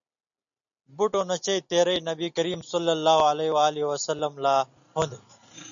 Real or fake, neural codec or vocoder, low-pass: real; none; 7.2 kHz